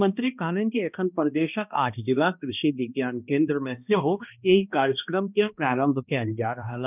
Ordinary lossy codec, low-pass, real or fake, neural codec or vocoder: none; 3.6 kHz; fake; codec, 16 kHz, 1 kbps, X-Codec, HuBERT features, trained on balanced general audio